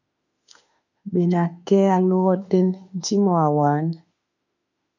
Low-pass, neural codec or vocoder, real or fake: 7.2 kHz; autoencoder, 48 kHz, 32 numbers a frame, DAC-VAE, trained on Japanese speech; fake